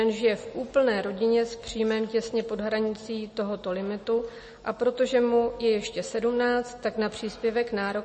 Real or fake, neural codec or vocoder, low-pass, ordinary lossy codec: real; none; 10.8 kHz; MP3, 32 kbps